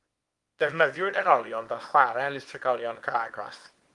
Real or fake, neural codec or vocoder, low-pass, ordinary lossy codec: fake; codec, 24 kHz, 0.9 kbps, WavTokenizer, small release; 10.8 kHz; Opus, 24 kbps